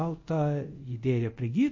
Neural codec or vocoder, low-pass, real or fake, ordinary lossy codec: codec, 24 kHz, 0.5 kbps, DualCodec; 7.2 kHz; fake; MP3, 32 kbps